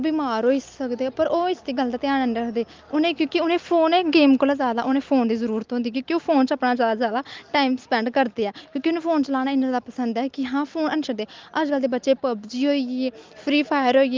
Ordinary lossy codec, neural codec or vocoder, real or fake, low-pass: Opus, 32 kbps; none; real; 7.2 kHz